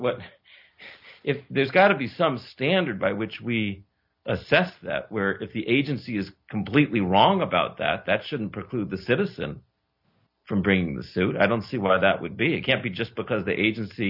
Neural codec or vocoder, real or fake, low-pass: none; real; 5.4 kHz